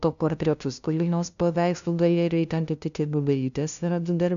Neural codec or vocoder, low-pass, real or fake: codec, 16 kHz, 0.5 kbps, FunCodec, trained on LibriTTS, 25 frames a second; 7.2 kHz; fake